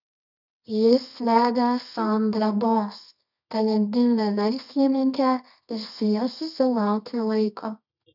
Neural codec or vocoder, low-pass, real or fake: codec, 24 kHz, 0.9 kbps, WavTokenizer, medium music audio release; 5.4 kHz; fake